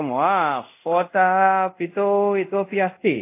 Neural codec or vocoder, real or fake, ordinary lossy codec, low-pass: codec, 24 kHz, 0.5 kbps, DualCodec; fake; AAC, 24 kbps; 3.6 kHz